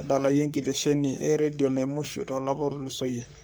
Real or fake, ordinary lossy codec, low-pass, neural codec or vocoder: fake; none; none; codec, 44.1 kHz, 3.4 kbps, Pupu-Codec